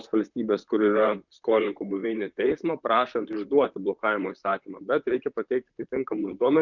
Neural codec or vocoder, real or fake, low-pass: vocoder, 44.1 kHz, 128 mel bands, Pupu-Vocoder; fake; 7.2 kHz